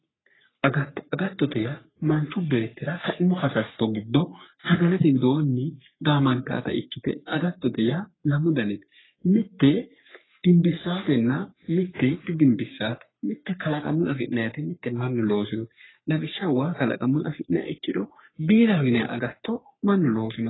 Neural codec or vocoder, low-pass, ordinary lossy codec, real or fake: codec, 44.1 kHz, 3.4 kbps, Pupu-Codec; 7.2 kHz; AAC, 16 kbps; fake